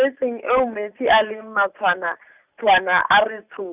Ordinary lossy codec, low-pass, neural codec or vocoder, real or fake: Opus, 64 kbps; 3.6 kHz; none; real